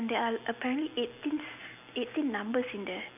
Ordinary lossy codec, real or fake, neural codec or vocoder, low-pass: none; real; none; 3.6 kHz